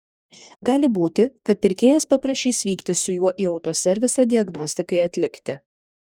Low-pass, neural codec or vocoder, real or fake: 19.8 kHz; codec, 44.1 kHz, 2.6 kbps, DAC; fake